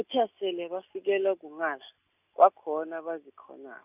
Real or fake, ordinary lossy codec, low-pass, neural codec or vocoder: real; none; 3.6 kHz; none